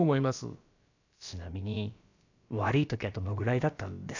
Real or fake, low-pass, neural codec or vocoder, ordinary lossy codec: fake; 7.2 kHz; codec, 16 kHz, about 1 kbps, DyCAST, with the encoder's durations; none